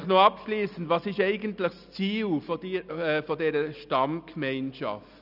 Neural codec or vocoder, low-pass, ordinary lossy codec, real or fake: none; 5.4 kHz; none; real